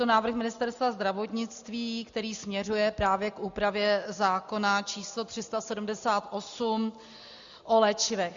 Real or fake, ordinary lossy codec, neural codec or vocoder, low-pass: real; Opus, 64 kbps; none; 7.2 kHz